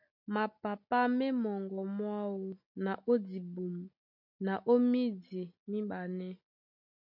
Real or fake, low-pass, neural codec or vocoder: real; 5.4 kHz; none